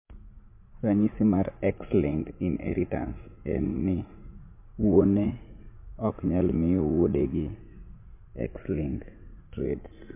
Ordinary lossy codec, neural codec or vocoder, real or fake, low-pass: MP3, 24 kbps; vocoder, 44.1 kHz, 80 mel bands, Vocos; fake; 3.6 kHz